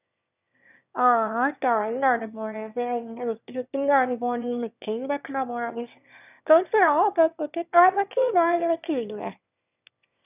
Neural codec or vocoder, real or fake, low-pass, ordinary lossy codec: autoencoder, 22.05 kHz, a latent of 192 numbers a frame, VITS, trained on one speaker; fake; 3.6 kHz; none